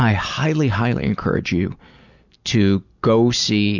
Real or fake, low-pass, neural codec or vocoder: real; 7.2 kHz; none